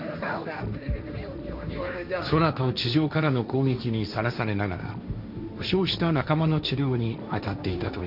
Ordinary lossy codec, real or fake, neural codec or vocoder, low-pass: none; fake; codec, 16 kHz, 1.1 kbps, Voila-Tokenizer; 5.4 kHz